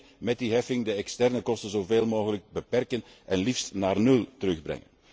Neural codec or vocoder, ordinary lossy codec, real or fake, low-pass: none; none; real; none